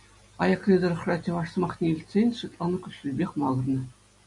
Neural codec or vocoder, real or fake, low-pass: none; real; 10.8 kHz